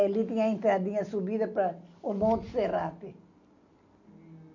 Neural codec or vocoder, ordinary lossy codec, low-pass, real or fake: none; none; 7.2 kHz; real